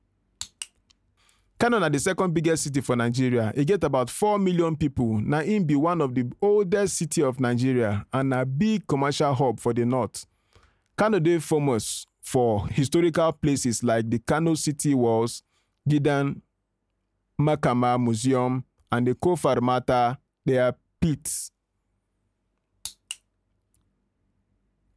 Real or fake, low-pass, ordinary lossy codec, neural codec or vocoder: real; none; none; none